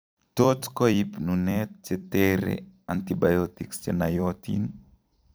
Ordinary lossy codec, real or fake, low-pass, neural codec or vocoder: none; fake; none; vocoder, 44.1 kHz, 128 mel bands every 256 samples, BigVGAN v2